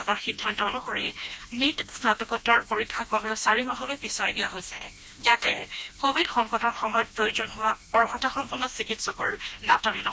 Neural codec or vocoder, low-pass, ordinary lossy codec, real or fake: codec, 16 kHz, 1 kbps, FreqCodec, smaller model; none; none; fake